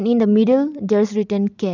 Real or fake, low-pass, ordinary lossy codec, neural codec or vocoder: real; 7.2 kHz; none; none